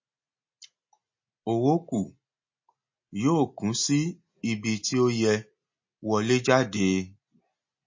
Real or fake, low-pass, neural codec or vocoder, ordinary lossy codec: real; 7.2 kHz; none; MP3, 32 kbps